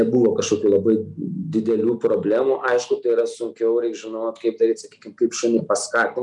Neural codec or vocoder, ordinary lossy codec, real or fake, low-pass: none; MP3, 96 kbps; real; 10.8 kHz